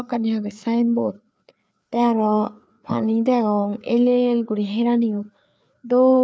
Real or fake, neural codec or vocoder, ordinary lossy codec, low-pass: fake; codec, 16 kHz, 4 kbps, FreqCodec, larger model; none; none